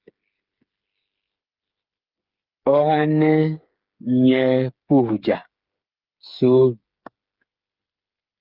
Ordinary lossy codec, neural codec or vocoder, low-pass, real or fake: Opus, 32 kbps; codec, 16 kHz, 8 kbps, FreqCodec, smaller model; 5.4 kHz; fake